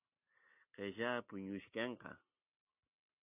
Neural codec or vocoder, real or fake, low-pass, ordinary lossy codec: none; real; 3.6 kHz; AAC, 24 kbps